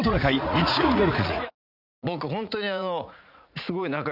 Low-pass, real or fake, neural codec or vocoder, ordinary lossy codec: 5.4 kHz; fake; vocoder, 44.1 kHz, 80 mel bands, Vocos; none